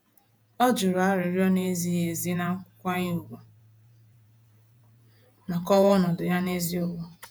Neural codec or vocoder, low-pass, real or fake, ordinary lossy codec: vocoder, 48 kHz, 128 mel bands, Vocos; none; fake; none